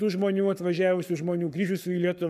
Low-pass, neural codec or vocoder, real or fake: 14.4 kHz; codec, 44.1 kHz, 7.8 kbps, Pupu-Codec; fake